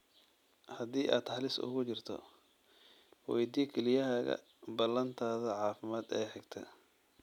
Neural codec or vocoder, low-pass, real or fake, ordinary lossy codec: none; 19.8 kHz; real; none